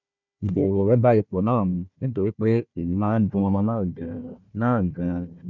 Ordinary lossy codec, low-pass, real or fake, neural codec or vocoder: none; 7.2 kHz; fake; codec, 16 kHz, 1 kbps, FunCodec, trained on Chinese and English, 50 frames a second